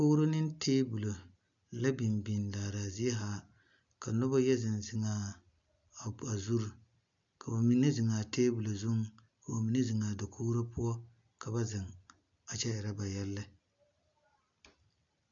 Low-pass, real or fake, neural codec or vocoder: 7.2 kHz; real; none